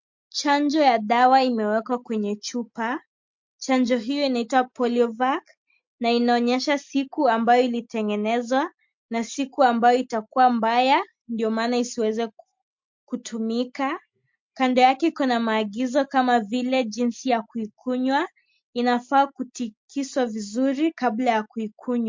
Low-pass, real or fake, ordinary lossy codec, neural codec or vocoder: 7.2 kHz; real; MP3, 48 kbps; none